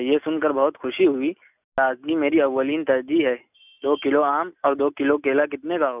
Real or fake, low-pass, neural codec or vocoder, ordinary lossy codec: real; 3.6 kHz; none; none